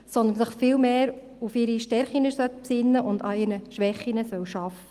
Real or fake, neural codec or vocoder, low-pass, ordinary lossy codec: real; none; 14.4 kHz; Opus, 24 kbps